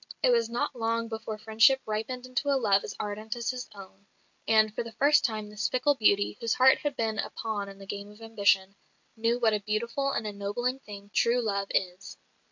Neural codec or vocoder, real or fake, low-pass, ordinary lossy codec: none; real; 7.2 kHz; MP3, 48 kbps